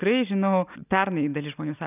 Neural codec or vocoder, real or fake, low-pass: none; real; 3.6 kHz